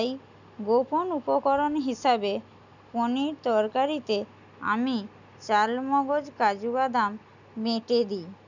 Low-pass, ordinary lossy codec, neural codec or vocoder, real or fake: 7.2 kHz; none; none; real